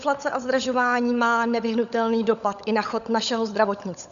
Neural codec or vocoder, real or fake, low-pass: codec, 16 kHz, 16 kbps, FunCodec, trained on LibriTTS, 50 frames a second; fake; 7.2 kHz